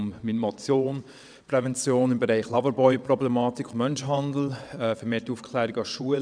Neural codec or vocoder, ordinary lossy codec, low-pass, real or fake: vocoder, 22.05 kHz, 80 mel bands, WaveNeXt; none; 9.9 kHz; fake